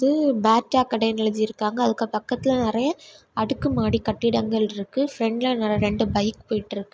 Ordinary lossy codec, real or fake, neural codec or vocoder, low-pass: none; real; none; none